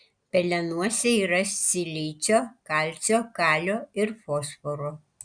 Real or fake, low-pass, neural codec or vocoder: real; 9.9 kHz; none